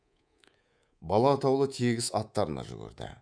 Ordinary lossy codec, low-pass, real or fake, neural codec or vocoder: none; 9.9 kHz; fake; codec, 24 kHz, 3.1 kbps, DualCodec